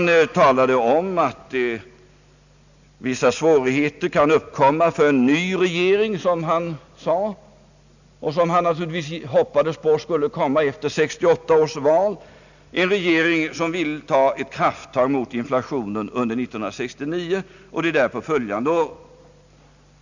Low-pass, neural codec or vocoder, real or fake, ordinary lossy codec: 7.2 kHz; none; real; none